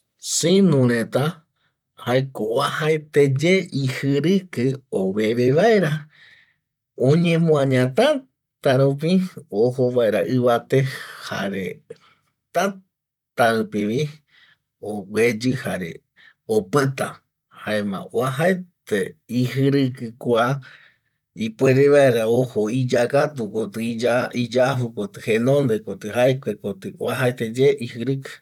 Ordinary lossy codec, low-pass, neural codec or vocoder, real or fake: none; 19.8 kHz; vocoder, 44.1 kHz, 128 mel bands, Pupu-Vocoder; fake